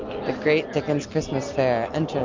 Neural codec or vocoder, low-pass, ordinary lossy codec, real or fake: none; 7.2 kHz; MP3, 64 kbps; real